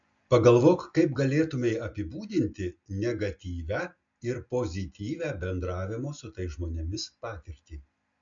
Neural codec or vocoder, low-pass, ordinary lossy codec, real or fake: none; 7.2 kHz; MP3, 64 kbps; real